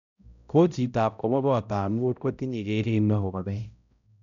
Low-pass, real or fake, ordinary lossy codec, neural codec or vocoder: 7.2 kHz; fake; none; codec, 16 kHz, 0.5 kbps, X-Codec, HuBERT features, trained on balanced general audio